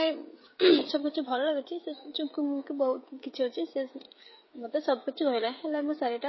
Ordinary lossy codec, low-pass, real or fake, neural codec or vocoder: MP3, 24 kbps; 7.2 kHz; fake; codec, 16 kHz, 16 kbps, FreqCodec, smaller model